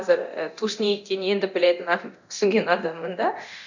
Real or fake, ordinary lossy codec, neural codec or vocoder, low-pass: fake; none; codec, 24 kHz, 0.9 kbps, DualCodec; 7.2 kHz